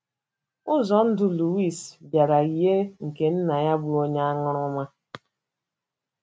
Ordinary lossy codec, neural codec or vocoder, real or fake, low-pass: none; none; real; none